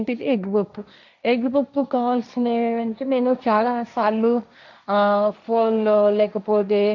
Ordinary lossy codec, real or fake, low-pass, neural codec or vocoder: none; fake; 7.2 kHz; codec, 16 kHz, 1.1 kbps, Voila-Tokenizer